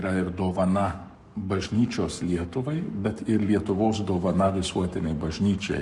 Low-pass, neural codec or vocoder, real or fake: 10.8 kHz; codec, 44.1 kHz, 7.8 kbps, Pupu-Codec; fake